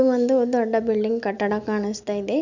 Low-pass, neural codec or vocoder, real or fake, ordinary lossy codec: 7.2 kHz; none; real; none